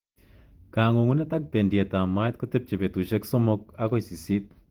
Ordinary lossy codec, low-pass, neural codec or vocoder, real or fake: Opus, 24 kbps; 19.8 kHz; vocoder, 48 kHz, 128 mel bands, Vocos; fake